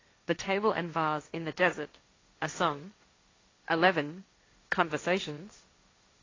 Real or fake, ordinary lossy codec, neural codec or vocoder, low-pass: fake; AAC, 32 kbps; codec, 16 kHz, 1.1 kbps, Voila-Tokenizer; 7.2 kHz